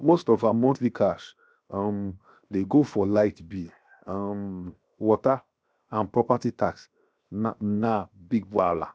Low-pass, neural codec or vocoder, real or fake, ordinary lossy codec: none; codec, 16 kHz, 0.7 kbps, FocalCodec; fake; none